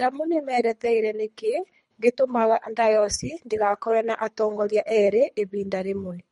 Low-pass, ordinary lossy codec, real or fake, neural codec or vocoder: 10.8 kHz; MP3, 48 kbps; fake; codec, 24 kHz, 3 kbps, HILCodec